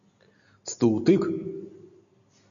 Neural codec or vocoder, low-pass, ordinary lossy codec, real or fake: none; 7.2 kHz; MP3, 96 kbps; real